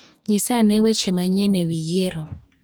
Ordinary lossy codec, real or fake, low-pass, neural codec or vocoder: none; fake; none; codec, 44.1 kHz, 2.6 kbps, DAC